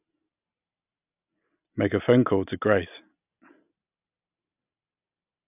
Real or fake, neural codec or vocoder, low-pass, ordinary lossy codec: real; none; 3.6 kHz; none